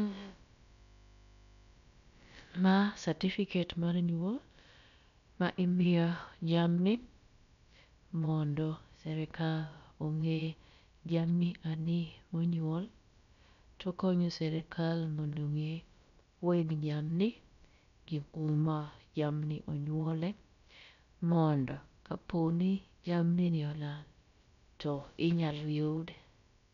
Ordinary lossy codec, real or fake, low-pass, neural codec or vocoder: MP3, 96 kbps; fake; 7.2 kHz; codec, 16 kHz, about 1 kbps, DyCAST, with the encoder's durations